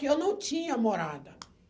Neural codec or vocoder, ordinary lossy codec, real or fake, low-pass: none; none; real; none